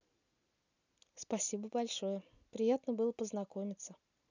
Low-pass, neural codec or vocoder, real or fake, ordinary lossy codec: 7.2 kHz; none; real; none